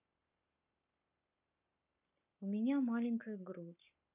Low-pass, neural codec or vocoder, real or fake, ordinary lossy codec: 3.6 kHz; codec, 44.1 kHz, 7.8 kbps, Pupu-Codec; fake; none